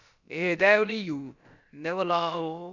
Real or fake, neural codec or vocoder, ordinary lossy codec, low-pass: fake; codec, 16 kHz, about 1 kbps, DyCAST, with the encoder's durations; Opus, 64 kbps; 7.2 kHz